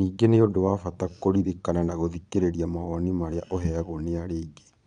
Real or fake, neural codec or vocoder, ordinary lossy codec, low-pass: fake; vocoder, 22.05 kHz, 80 mel bands, Vocos; none; 9.9 kHz